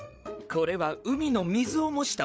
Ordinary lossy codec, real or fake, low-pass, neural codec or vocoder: none; fake; none; codec, 16 kHz, 8 kbps, FreqCodec, larger model